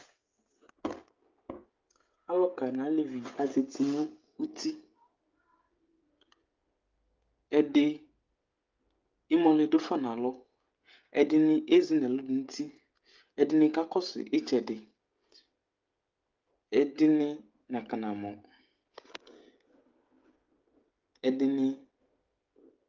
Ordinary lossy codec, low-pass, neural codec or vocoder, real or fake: Opus, 32 kbps; 7.2 kHz; codec, 16 kHz, 16 kbps, FreqCodec, smaller model; fake